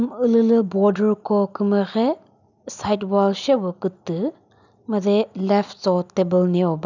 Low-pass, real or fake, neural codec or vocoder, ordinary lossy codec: 7.2 kHz; real; none; none